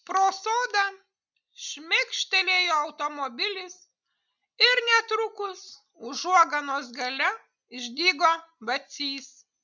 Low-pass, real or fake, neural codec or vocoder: 7.2 kHz; real; none